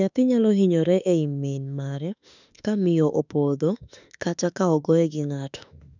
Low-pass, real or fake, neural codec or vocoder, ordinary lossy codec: 7.2 kHz; fake; autoencoder, 48 kHz, 32 numbers a frame, DAC-VAE, trained on Japanese speech; none